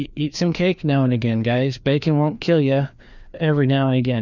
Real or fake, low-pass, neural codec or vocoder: fake; 7.2 kHz; codec, 16 kHz, 2 kbps, FreqCodec, larger model